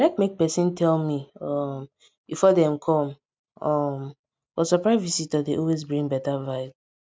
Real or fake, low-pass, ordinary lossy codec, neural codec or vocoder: real; none; none; none